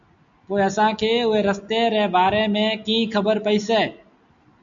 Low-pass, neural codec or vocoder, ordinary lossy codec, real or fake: 7.2 kHz; none; MP3, 64 kbps; real